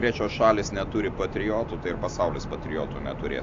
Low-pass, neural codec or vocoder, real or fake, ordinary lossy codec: 7.2 kHz; none; real; AAC, 48 kbps